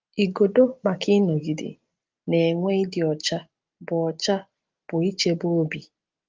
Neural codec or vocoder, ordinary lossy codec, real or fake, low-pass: none; Opus, 24 kbps; real; 7.2 kHz